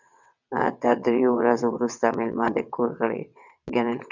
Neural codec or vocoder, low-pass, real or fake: vocoder, 22.05 kHz, 80 mel bands, WaveNeXt; 7.2 kHz; fake